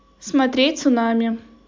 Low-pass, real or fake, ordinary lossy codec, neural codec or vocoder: 7.2 kHz; real; MP3, 64 kbps; none